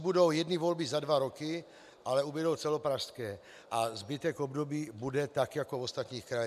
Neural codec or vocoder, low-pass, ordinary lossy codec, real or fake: none; 14.4 kHz; MP3, 96 kbps; real